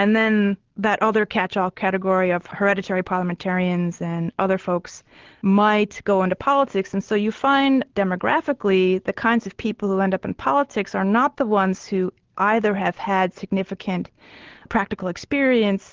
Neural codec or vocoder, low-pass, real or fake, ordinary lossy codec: none; 7.2 kHz; real; Opus, 16 kbps